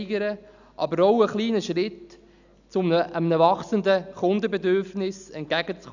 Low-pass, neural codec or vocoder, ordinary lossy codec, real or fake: 7.2 kHz; none; none; real